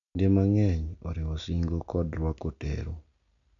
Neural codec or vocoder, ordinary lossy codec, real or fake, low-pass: none; none; real; 7.2 kHz